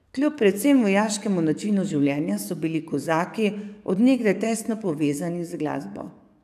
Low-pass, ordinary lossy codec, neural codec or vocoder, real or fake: 14.4 kHz; none; codec, 44.1 kHz, 7.8 kbps, DAC; fake